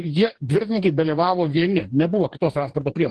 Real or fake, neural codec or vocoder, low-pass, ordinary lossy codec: fake; codec, 44.1 kHz, 2.6 kbps, DAC; 10.8 kHz; Opus, 16 kbps